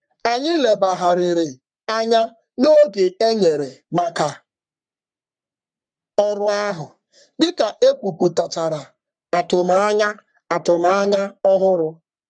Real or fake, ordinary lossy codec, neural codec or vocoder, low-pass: fake; none; codec, 44.1 kHz, 3.4 kbps, Pupu-Codec; 9.9 kHz